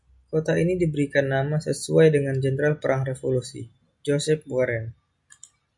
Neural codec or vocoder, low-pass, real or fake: none; 10.8 kHz; real